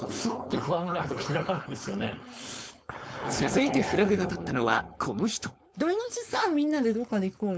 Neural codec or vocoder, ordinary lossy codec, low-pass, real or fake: codec, 16 kHz, 4.8 kbps, FACodec; none; none; fake